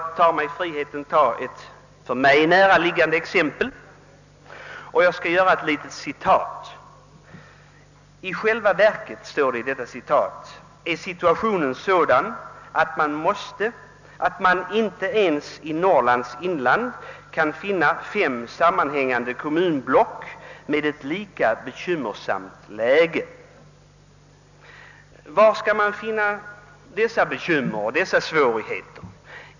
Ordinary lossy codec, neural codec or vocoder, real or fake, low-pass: none; none; real; 7.2 kHz